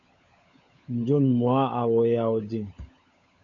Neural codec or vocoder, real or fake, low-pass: codec, 16 kHz, 16 kbps, FunCodec, trained on LibriTTS, 50 frames a second; fake; 7.2 kHz